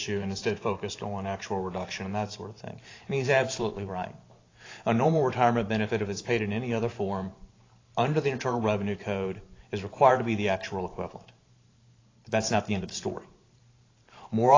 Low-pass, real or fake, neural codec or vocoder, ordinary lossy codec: 7.2 kHz; fake; vocoder, 44.1 kHz, 128 mel bands every 512 samples, BigVGAN v2; AAC, 32 kbps